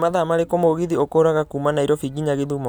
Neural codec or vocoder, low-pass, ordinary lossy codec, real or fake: none; none; none; real